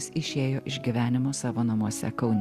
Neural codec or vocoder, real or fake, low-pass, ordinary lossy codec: none; real; 14.4 kHz; Opus, 64 kbps